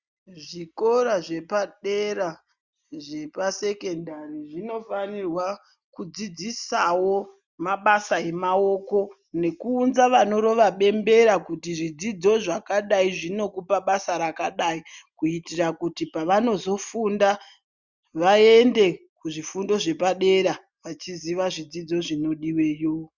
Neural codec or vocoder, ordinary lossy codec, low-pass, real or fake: none; Opus, 64 kbps; 7.2 kHz; real